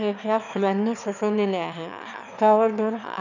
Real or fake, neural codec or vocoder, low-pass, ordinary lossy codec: fake; autoencoder, 22.05 kHz, a latent of 192 numbers a frame, VITS, trained on one speaker; 7.2 kHz; none